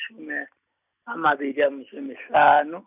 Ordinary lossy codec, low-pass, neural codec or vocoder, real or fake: none; 3.6 kHz; none; real